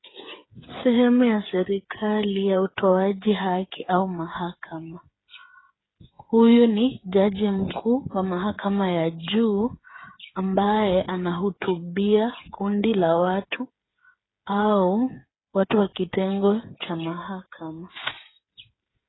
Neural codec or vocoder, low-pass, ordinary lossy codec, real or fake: codec, 16 kHz, 8 kbps, FreqCodec, smaller model; 7.2 kHz; AAC, 16 kbps; fake